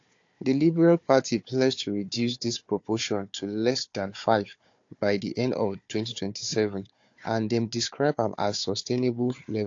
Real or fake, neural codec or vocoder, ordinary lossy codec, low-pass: fake; codec, 16 kHz, 4 kbps, FunCodec, trained on Chinese and English, 50 frames a second; AAC, 48 kbps; 7.2 kHz